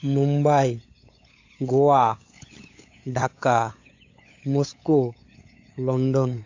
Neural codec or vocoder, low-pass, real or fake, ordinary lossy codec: codec, 16 kHz, 8 kbps, FunCodec, trained on LibriTTS, 25 frames a second; 7.2 kHz; fake; AAC, 48 kbps